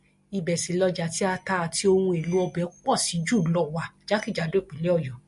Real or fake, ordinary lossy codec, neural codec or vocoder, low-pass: real; MP3, 48 kbps; none; 14.4 kHz